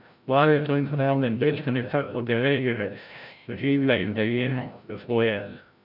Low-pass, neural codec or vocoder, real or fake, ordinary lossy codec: 5.4 kHz; codec, 16 kHz, 0.5 kbps, FreqCodec, larger model; fake; none